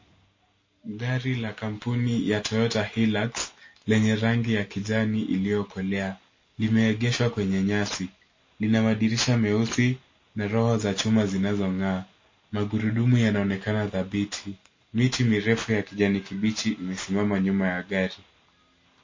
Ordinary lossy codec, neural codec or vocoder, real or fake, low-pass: MP3, 32 kbps; none; real; 7.2 kHz